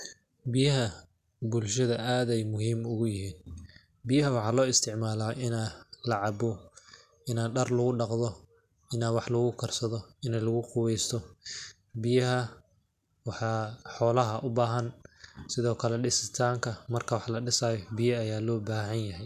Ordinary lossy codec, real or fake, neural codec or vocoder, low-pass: none; real; none; 14.4 kHz